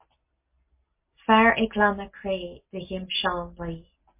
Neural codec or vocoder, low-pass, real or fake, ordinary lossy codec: none; 3.6 kHz; real; MP3, 24 kbps